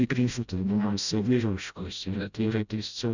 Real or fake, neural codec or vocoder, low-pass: fake; codec, 16 kHz, 0.5 kbps, FreqCodec, smaller model; 7.2 kHz